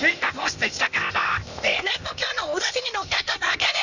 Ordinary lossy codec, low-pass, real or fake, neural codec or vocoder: none; 7.2 kHz; fake; codec, 16 kHz, 0.8 kbps, ZipCodec